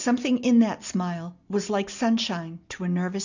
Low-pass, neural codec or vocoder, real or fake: 7.2 kHz; none; real